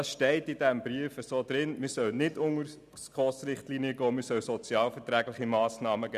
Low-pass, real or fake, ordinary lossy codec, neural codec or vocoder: 14.4 kHz; real; none; none